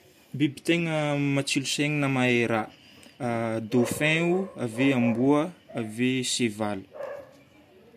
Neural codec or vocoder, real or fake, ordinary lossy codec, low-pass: none; real; MP3, 64 kbps; 14.4 kHz